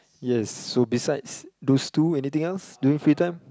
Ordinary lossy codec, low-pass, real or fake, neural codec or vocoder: none; none; real; none